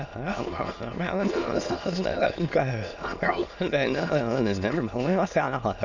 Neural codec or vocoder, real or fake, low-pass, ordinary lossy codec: autoencoder, 22.05 kHz, a latent of 192 numbers a frame, VITS, trained on many speakers; fake; 7.2 kHz; none